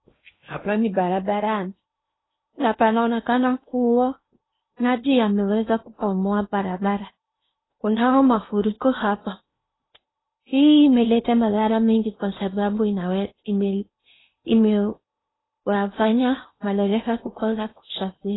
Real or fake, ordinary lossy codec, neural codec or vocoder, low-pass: fake; AAC, 16 kbps; codec, 16 kHz in and 24 kHz out, 0.8 kbps, FocalCodec, streaming, 65536 codes; 7.2 kHz